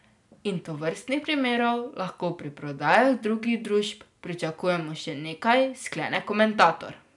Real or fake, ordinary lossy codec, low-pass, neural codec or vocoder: real; none; 10.8 kHz; none